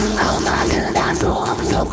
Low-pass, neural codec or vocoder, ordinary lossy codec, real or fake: none; codec, 16 kHz, 4.8 kbps, FACodec; none; fake